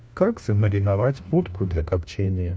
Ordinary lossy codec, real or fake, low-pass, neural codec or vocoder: none; fake; none; codec, 16 kHz, 1 kbps, FunCodec, trained on LibriTTS, 50 frames a second